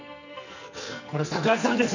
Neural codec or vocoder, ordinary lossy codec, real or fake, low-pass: codec, 44.1 kHz, 2.6 kbps, SNAC; none; fake; 7.2 kHz